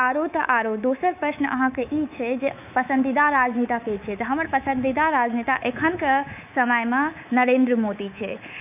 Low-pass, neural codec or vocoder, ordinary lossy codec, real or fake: 3.6 kHz; codec, 24 kHz, 3.1 kbps, DualCodec; none; fake